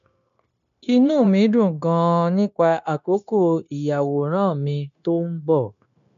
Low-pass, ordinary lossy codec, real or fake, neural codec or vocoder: 7.2 kHz; none; fake; codec, 16 kHz, 0.9 kbps, LongCat-Audio-Codec